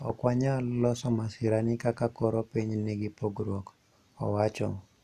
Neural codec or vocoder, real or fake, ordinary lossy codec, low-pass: none; real; none; 14.4 kHz